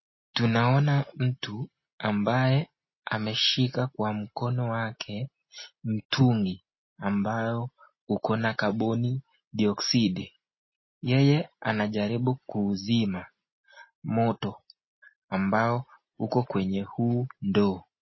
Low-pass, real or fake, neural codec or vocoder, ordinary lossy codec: 7.2 kHz; real; none; MP3, 24 kbps